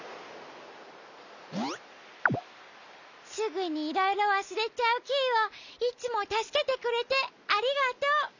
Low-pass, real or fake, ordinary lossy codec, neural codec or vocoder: 7.2 kHz; real; none; none